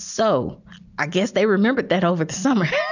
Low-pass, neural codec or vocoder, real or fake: 7.2 kHz; none; real